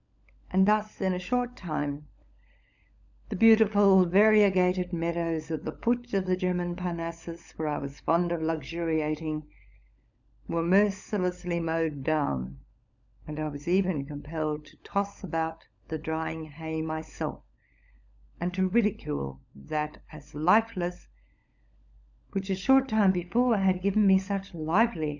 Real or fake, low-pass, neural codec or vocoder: fake; 7.2 kHz; codec, 16 kHz, 16 kbps, FunCodec, trained on LibriTTS, 50 frames a second